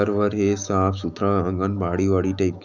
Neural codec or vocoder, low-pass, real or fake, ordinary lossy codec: autoencoder, 48 kHz, 128 numbers a frame, DAC-VAE, trained on Japanese speech; 7.2 kHz; fake; none